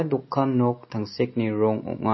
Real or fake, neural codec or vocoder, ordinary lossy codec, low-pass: real; none; MP3, 24 kbps; 7.2 kHz